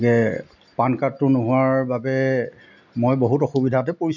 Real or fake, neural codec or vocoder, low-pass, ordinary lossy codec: real; none; 7.2 kHz; none